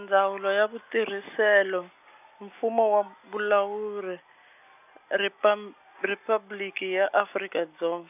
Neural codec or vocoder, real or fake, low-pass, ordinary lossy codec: none; real; 3.6 kHz; none